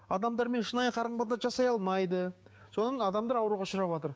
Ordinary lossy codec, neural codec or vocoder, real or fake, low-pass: none; codec, 16 kHz, 6 kbps, DAC; fake; none